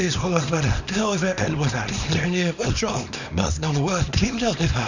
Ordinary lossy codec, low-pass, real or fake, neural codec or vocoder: none; 7.2 kHz; fake; codec, 24 kHz, 0.9 kbps, WavTokenizer, small release